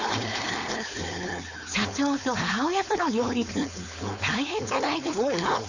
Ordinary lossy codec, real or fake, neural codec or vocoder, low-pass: none; fake; codec, 16 kHz, 4.8 kbps, FACodec; 7.2 kHz